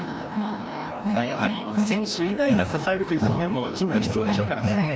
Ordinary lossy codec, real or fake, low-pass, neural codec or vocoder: none; fake; none; codec, 16 kHz, 1 kbps, FreqCodec, larger model